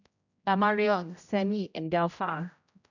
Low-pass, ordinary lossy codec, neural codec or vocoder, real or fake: 7.2 kHz; none; codec, 16 kHz, 0.5 kbps, X-Codec, HuBERT features, trained on general audio; fake